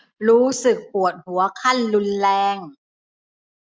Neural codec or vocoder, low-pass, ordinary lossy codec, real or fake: none; none; none; real